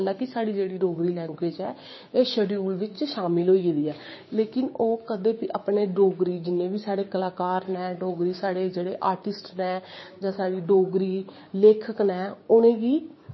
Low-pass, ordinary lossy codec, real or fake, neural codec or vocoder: 7.2 kHz; MP3, 24 kbps; fake; codec, 16 kHz, 6 kbps, DAC